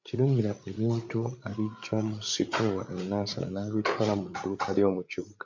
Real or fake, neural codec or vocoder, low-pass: fake; codec, 16 kHz, 8 kbps, FreqCodec, larger model; 7.2 kHz